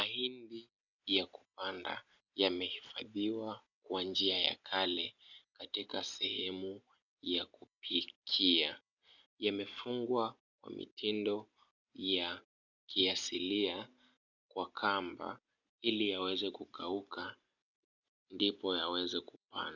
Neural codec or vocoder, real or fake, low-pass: none; real; 7.2 kHz